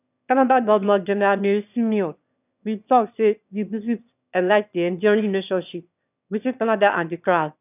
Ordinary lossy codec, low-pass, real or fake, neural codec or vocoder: none; 3.6 kHz; fake; autoencoder, 22.05 kHz, a latent of 192 numbers a frame, VITS, trained on one speaker